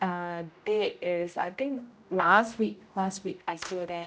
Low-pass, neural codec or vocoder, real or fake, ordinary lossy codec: none; codec, 16 kHz, 0.5 kbps, X-Codec, HuBERT features, trained on general audio; fake; none